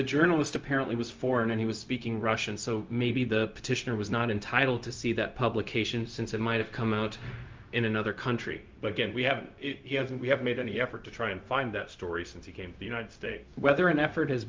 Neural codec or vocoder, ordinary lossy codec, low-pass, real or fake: codec, 16 kHz, 0.4 kbps, LongCat-Audio-Codec; Opus, 24 kbps; 7.2 kHz; fake